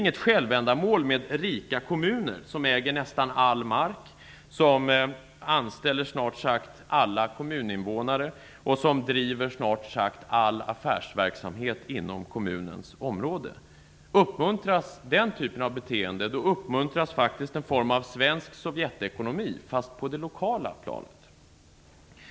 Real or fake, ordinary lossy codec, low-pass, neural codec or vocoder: real; none; none; none